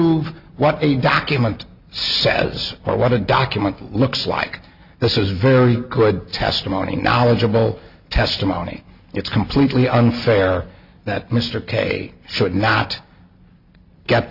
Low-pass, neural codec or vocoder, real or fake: 5.4 kHz; none; real